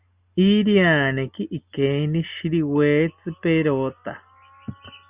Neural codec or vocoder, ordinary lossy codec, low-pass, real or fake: none; Opus, 64 kbps; 3.6 kHz; real